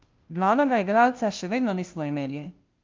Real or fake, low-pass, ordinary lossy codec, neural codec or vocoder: fake; 7.2 kHz; Opus, 32 kbps; codec, 16 kHz, 0.5 kbps, FunCodec, trained on Chinese and English, 25 frames a second